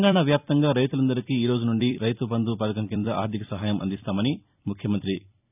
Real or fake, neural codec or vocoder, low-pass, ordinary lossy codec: fake; vocoder, 44.1 kHz, 128 mel bands every 256 samples, BigVGAN v2; 3.6 kHz; none